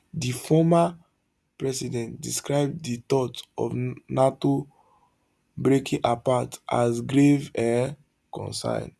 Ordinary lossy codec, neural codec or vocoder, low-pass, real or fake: none; none; none; real